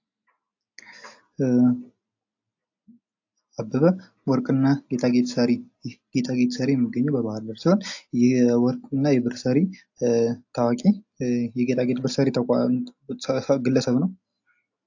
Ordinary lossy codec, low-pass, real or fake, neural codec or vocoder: AAC, 48 kbps; 7.2 kHz; real; none